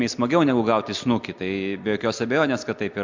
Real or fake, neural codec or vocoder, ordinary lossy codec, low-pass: real; none; MP3, 64 kbps; 7.2 kHz